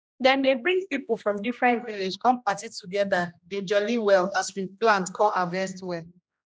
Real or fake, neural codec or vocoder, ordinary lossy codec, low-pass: fake; codec, 16 kHz, 1 kbps, X-Codec, HuBERT features, trained on general audio; none; none